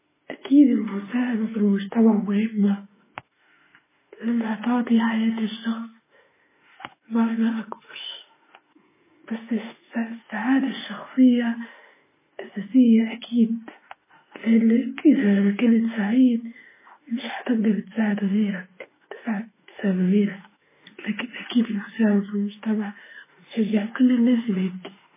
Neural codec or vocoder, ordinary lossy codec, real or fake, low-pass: autoencoder, 48 kHz, 32 numbers a frame, DAC-VAE, trained on Japanese speech; MP3, 16 kbps; fake; 3.6 kHz